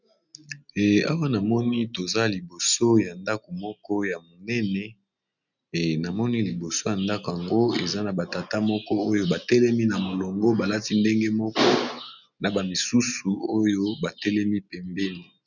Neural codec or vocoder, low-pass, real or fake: none; 7.2 kHz; real